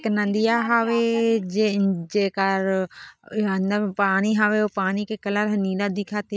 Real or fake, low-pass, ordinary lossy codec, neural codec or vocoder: real; none; none; none